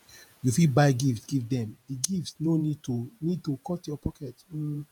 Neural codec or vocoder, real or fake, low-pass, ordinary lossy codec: vocoder, 48 kHz, 128 mel bands, Vocos; fake; 19.8 kHz; none